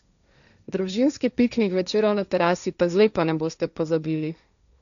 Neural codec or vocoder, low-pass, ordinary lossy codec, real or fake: codec, 16 kHz, 1.1 kbps, Voila-Tokenizer; 7.2 kHz; none; fake